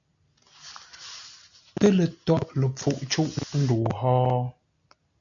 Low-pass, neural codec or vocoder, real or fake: 7.2 kHz; none; real